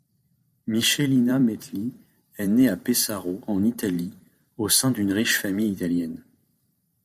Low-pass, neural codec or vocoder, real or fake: 14.4 kHz; vocoder, 44.1 kHz, 128 mel bands every 512 samples, BigVGAN v2; fake